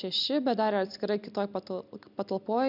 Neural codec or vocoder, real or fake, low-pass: none; real; 5.4 kHz